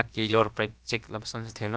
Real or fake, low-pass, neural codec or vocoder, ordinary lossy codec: fake; none; codec, 16 kHz, about 1 kbps, DyCAST, with the encoder's durations; none